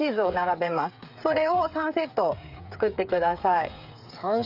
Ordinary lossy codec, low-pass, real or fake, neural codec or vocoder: none; 5.4 kHz; fake; codec, 16 kHz, 8 kbps, FreqCodec, smaller model